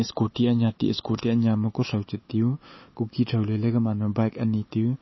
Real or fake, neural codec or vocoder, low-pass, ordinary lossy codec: fake; autoencoder, 48 kHz, 128 numbers a frame, DAC-VAE, trained on Japanese speech; 7.2 kHz; MP3, 24 kbps